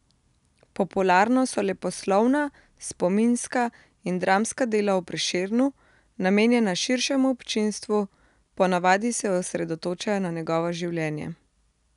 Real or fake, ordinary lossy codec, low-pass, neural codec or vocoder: real; none; 10.8 kHz; none